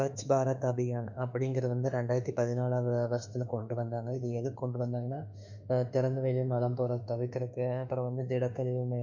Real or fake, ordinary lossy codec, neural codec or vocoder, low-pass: fake; none; autoencoder, 48 kHz, 32 numbers a frame, DAC-VAE, trained on Japanese speech; 7.2 kHz